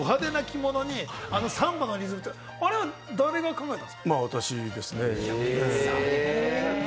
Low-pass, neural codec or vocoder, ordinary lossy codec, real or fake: none; none; none; real